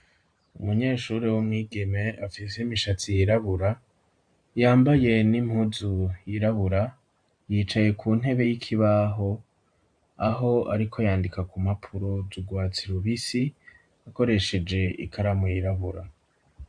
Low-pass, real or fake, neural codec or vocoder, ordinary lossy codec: 9.9 kHz; fake; vocoder, 44.1 kHz, 128 mel bands every 512 samples, BigVGAN v2; AAC, 64 kbps